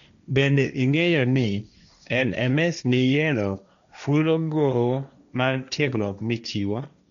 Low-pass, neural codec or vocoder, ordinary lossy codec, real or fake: 7.2 kHz; codec, 16 kHz, 1.1 kbps, Voila-Tokenizer; none; fake